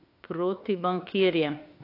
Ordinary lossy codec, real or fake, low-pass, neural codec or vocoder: AAC, 32 kbps; fake; 5.4 kHz; autoencoder, 48 kHz, 32 numbers a frame, DAC-VAE, trained on Japanese speech